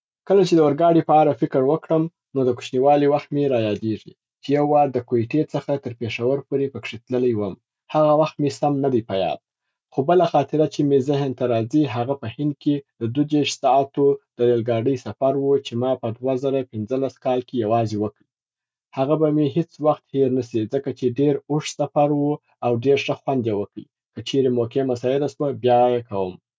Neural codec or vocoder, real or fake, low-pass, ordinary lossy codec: none; real; none; none